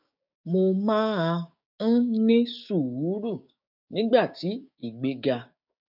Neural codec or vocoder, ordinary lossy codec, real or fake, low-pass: codec, 16 kHz, 6 kbps, DAC; none; fake; 5.4 kHz